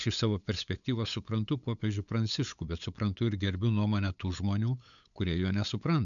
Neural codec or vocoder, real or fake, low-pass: codec, 16 kHz, 8 kbps, FunCodec, trained on LibriTTS, 25 frames a second; fake; 7.2 kHz